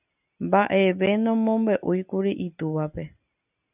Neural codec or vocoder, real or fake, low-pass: none; real; 3.6 kHz